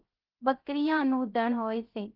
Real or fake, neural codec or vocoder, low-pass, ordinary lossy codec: fake; codec, 16 kHz, 0.3 kbps, FocalCodec; 5.4 kHz; Opus, 24 kbps